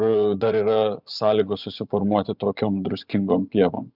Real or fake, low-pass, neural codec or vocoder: fake; 5.4 kHz; vocoder, 24 kHz, 100 mel bands, Vocos